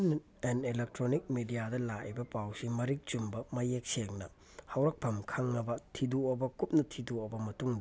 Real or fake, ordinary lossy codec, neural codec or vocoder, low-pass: real; none; none; none